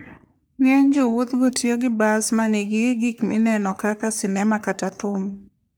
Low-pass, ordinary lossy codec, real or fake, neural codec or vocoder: none; none; fake; codec, 44.1 kHz, 3.4 kbps, Pupu-Codec